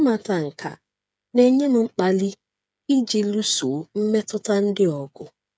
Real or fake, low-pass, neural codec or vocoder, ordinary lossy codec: fake; none; codec, 16 kHz, 8 kbps, FreqCodec, smaller model; none